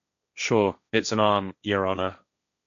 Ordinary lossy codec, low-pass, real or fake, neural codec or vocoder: none; 7.2 kHz; fake; codec, 16 kHz, 1.1 kbps, Voila-Tokenizer